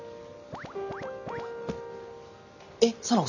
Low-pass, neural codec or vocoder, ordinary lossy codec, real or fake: 7.2 kHz; none; none; real